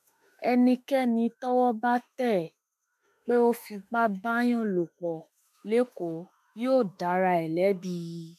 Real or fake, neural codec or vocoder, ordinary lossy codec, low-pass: fake; autoencoder, 48 kHz, 32 numbers a frame, DAC-VAE, trained on Japanese speech; MP3, 96 kbps; 14.4 kHz